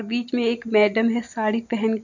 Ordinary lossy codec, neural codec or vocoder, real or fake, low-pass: none; none; real; 7.2 kHz